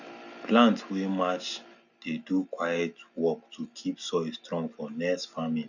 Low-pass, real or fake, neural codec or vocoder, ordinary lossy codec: 7.2 kHz; real; none; none